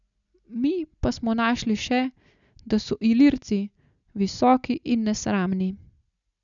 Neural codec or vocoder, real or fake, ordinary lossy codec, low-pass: none; real; none; 7.2 kHz